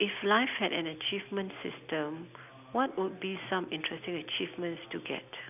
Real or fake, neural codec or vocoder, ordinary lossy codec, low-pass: real; none; none; 3.6 kHz